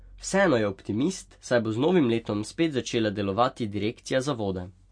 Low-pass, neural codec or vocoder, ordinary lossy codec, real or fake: 9.9 kHz; none; MP3, 48 kbps; real